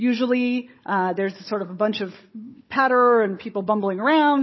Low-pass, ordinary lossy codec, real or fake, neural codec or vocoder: 7.2 kHz; MP3, 24 kbps; fake; codec, 16 kHz, 16 kbps, FunCodec, trained on Chinese and English, 50 frames a second